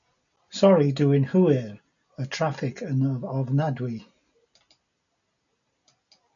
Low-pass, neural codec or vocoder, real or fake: 7.2 kHz; none; real